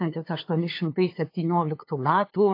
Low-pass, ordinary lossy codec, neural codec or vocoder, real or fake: 5.4 kHz; AAC, 32 kbps; codec, 16 kHz, 2 kbps, FunCodec, trained on LibriTTS, 25 frames a second; fake